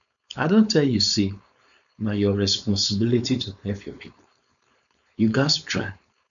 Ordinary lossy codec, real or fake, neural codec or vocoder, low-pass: none; fake; codec, 16 kHz, 4.8 kbps, FACodec; 7.2 kHz